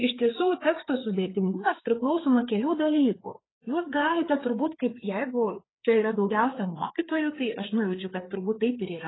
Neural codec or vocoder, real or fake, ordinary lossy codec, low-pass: codec, 16 kHz, 4 kbps, FreqCodec, larger model; fake; AAC, 16 kbps; 7.2 kHz